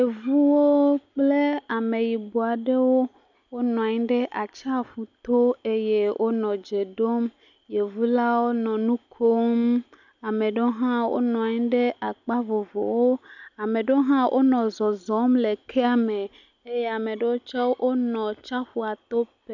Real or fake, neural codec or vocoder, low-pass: real; none; 7.2 kHz